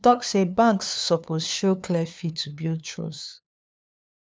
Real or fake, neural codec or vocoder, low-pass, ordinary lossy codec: fake; codec, 16 kHz, 2 kbps, FunCodec, trained on LibriTTS, 25 frames a second; none; none